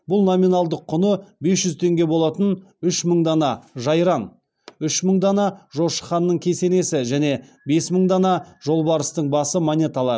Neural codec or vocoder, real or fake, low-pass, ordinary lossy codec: none; real; none; none